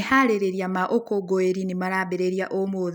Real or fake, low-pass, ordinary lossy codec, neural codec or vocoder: real; none; none; none